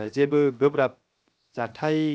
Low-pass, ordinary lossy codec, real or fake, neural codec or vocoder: none; none; fake; codec, 16 kHz, 0.7 kbps, FocalCodec